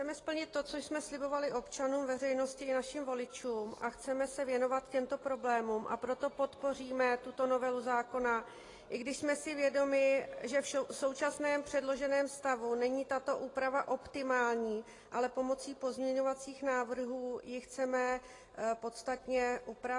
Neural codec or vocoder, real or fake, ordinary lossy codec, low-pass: none; real; AAC, 32 kbps; 10.8 kHz